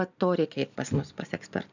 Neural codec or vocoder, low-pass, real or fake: none; 7.2 kHz; real